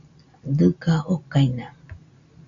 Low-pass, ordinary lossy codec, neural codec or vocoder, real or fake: 7.2 kHz; AAC, 64 kbps; none; real